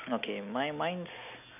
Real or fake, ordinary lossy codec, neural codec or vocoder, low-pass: real; none; none; 3.6 kHz